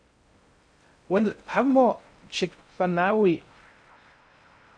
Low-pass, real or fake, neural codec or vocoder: 9.9 kHz; fake; codec, 16 kHz in and 24 kHz out, 0.6 kbps, FocalCodec, streaming, 2048 codes